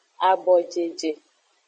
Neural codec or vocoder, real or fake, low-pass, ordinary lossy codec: vocoder, 22.05 kHz, 80 mel bands, Vocos; fake; 9.9 kHz; MP3, 32 kbps